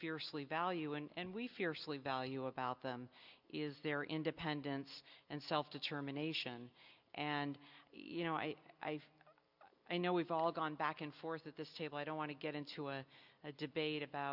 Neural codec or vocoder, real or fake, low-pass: none; real; 5.4 kHz